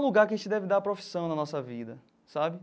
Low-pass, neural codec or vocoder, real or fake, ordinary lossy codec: none; none; real; none